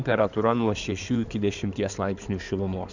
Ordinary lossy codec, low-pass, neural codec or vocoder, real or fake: Opus, 64 kbps; 7.2 kHz; codec, 16 kHz in and 24 kHz out, 2.2 kbps, FireRedTTS-2 codec; fake